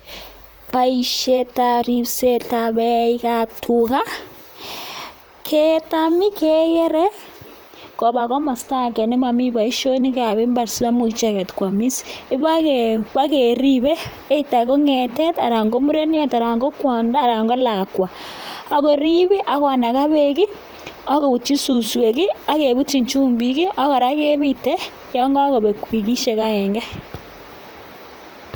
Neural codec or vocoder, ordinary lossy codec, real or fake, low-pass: vocoder, 44.1 kHz, 128 mel bands, Pupu-Vocoder; none; fake; none